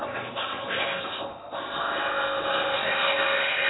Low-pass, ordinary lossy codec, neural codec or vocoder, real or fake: 7.2 kHz; AAC, 16 kbps; codec, 16 kHz in and 24 kHz out, 0.8 kbps, FocalCodec, streaming, 65536 codes; fake